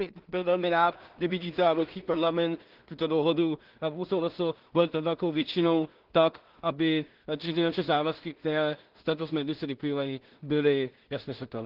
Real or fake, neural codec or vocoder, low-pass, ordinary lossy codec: fake; codec, 16 kHz in and 24 kHz out, 0.4 kbps, LongCat-Audio-Codec, two codebook decoder; 5.4 kHz; Opus, 16 kbps